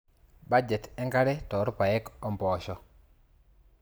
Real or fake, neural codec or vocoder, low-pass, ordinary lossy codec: real; none; none; none